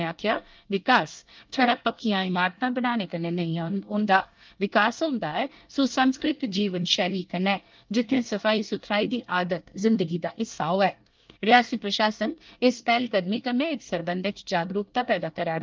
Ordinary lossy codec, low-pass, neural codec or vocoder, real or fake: Opus, 24 kbps; 7.2 kHz; codec, 24 kHz, 1 kbps, SNAC; fake